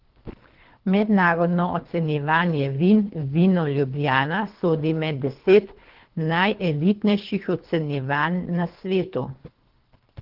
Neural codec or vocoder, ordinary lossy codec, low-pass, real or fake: codec, 24 kHz, 3 kbps, HILCodec; Opus, 16 kbps; 5.4 kHz; fake